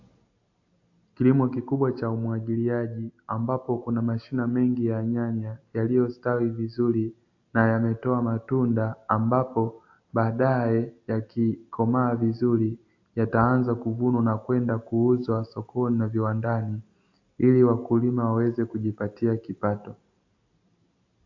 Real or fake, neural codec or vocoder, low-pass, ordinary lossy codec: real; none; 7.2 kHz; AAC, 48 kbps